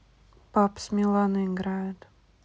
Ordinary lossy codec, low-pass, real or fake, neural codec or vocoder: none; none; real; none